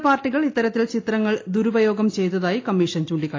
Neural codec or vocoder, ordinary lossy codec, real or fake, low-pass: none; AAC, 32 kbps; real; 7.2 kHz